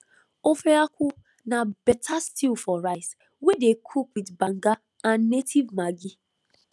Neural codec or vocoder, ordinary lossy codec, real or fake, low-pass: none; none; real; none